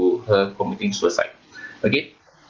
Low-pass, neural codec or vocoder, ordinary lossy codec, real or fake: 7.2 kHz; none; Opus, 16 kbps; real